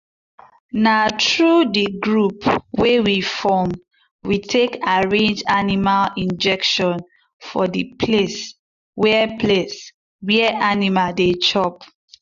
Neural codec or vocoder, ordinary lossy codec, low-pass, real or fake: none; none; 7.2 kHz; real